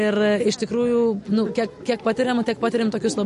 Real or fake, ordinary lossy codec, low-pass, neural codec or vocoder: real; MP3, 48 kbps; 14.4 kHz; none